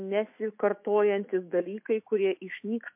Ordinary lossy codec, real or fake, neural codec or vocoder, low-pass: MP3, 32 kbps; fake; autoencoder, 48 kHz, 128 numbers a frame, DAC-VAE, trained on Japanese speech; 3.6 kHz